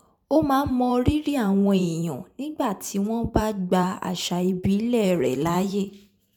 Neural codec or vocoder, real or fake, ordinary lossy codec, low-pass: vocoder, 44.1 kHz, 128 mel bands every 512 samples, BigVGAN v2; fake; none; 19.8 kHz